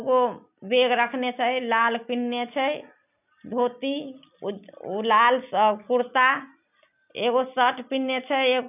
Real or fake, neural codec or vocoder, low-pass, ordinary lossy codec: real; none; 3.6 kHz; none